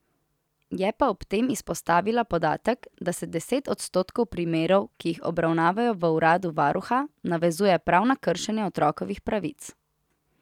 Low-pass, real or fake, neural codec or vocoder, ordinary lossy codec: 19.8 kHz; real; none; none